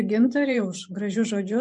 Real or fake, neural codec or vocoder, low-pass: fake; vocoder, 24 kHz, 100 mel bands, Vocos; 10.8 kHz